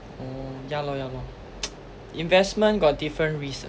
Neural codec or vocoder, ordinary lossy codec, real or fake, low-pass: none; none; real; none